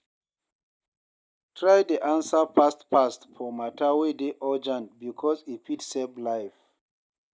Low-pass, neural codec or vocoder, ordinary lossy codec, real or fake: none; none; none; real